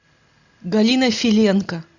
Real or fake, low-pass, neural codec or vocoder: real; 7.2 kHz; none